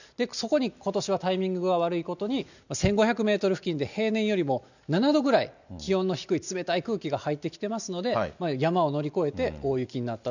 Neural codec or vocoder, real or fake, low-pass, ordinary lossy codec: none; real; 7.2 kHz; none